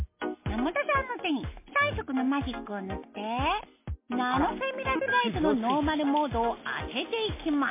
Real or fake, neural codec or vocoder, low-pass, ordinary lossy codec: real; none; 3.6 kHz; MP3, 24 kbps